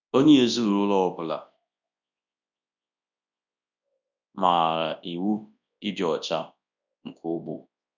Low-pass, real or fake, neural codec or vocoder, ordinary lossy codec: 7.2 kHz; fake; codec, 24 kHz, 0.9 kbps, WavTokenizer, large speech release; none